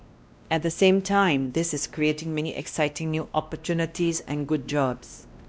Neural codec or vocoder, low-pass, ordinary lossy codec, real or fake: codec, 16 kHz, 1 kbps, X-Codec, WavLM features, trained on Multilingual LibriSpeech; none; none; fake